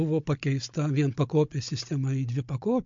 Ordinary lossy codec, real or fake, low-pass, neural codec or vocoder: MP3, 48 kbps; fake; 7.2 kHz; codec, 16 kHz, 16 kbps, FunCodec, trained on Chinese and English, 50 frames a second